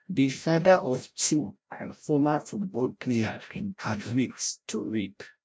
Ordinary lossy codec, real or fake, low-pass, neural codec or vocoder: none; fake; none; codec, 16 kHz, 0.5 kbps, FreqCodec, larger model